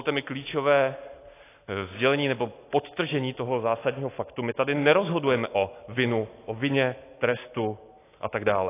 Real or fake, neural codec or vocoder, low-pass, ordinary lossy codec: real; none; 3.6 kHz; AAC, 24 kbps